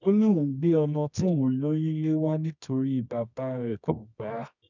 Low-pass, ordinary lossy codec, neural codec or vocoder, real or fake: 7.2 kHz; none; codec, 24 kHz, 0.9 kbps, WavTokenizer, medium music audio release; fake